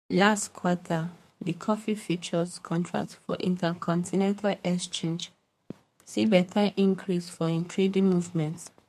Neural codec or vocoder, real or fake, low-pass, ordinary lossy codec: codec, 32 kHz, 1.9 kbps, SNAC; fake; 14.4 kHz; MP3, 64 kbps